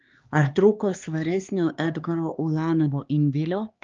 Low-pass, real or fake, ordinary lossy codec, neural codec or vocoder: 7.2 kHz; fake; Opus, 24 kbps; codec, 16 kHz, 2 kbps, X-Codec, HuBERT features, trained on LibriSpeech